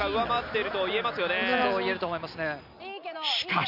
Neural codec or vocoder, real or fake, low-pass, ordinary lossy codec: none; real; 5.4 kHz; none